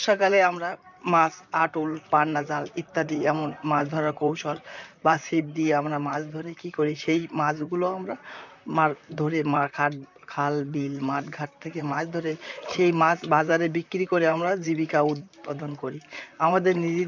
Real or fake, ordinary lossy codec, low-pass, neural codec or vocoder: fake; none; 7.2 kHz; vocoder, 44.1 kHz, 128 mel bands, Pupu-Vocoder